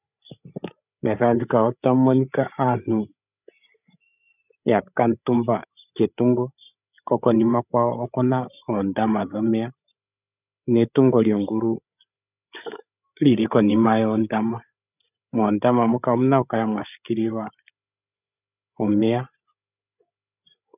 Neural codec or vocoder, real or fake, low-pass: codec, 16 kHz, 8 kbps, FreqCodec, larger model; fake; 3.6 kHz